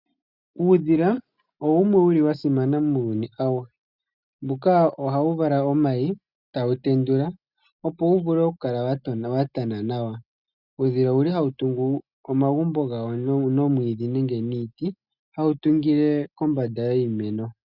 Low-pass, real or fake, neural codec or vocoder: 5.4 kHz; real; none